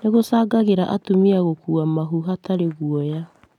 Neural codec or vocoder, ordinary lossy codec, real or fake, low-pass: none; none; real; 19.8 kHz